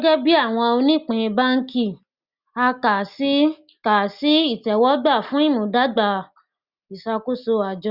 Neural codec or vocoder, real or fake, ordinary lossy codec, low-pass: none; real; Opus, 64 kbps; 5.4 kHz